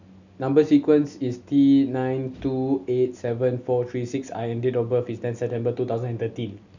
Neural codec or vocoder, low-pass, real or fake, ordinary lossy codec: none; 7.2 kHz; real; none